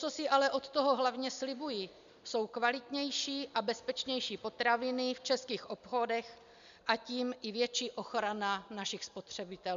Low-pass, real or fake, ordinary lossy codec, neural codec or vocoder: 7.2 kHz; real; MP3, 64 kbps; none